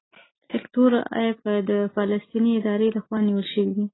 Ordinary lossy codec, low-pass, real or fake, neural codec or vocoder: AAC, 16 kbps; 7.2 kHz; real; none